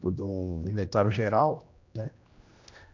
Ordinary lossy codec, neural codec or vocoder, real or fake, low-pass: MP3, 64 kbps; codec, 16 kHz, 1 kbps, X-Codec, HuBERT features, trained on general audio; fake; 7.2 kHz